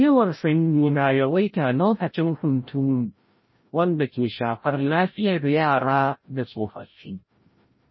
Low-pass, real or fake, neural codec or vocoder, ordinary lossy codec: 7.2 kHz; fake; codec, 16 kHz, 0.5 kbps, FreqCodec, larger model; MP3, 24 kbps